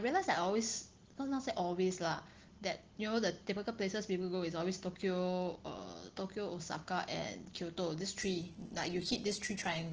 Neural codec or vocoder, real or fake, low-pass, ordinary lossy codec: none; real; 7.2 kHz; Opus, 16 kbps